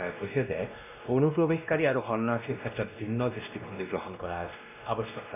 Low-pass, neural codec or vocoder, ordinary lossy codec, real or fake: 3.6 kHz; codec, 16 kHz, 1 kbps, X-Codec, WavLM features, trained on Multilingual LibriSpeech; none; fake